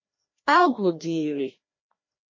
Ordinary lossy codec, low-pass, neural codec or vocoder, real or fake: MP3, 32 kbps; 7.2 kHz; codec, 16 kHz, 1 kbps, FreqCodec, larger model; fake